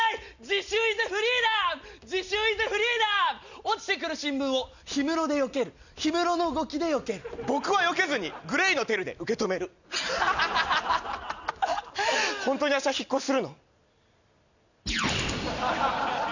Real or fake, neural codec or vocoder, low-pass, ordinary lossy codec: real; none; 7.2 kHz; none